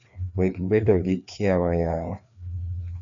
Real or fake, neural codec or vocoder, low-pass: fake; codec, 16 kHz, 2 kbps, FreqCodec, larger model; 7.2 kHz